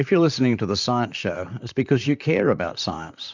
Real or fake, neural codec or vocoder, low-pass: fake; vocoder, 44.1 kHz, 128 mel bands, Pupu-Vocoder; 7.2 kHz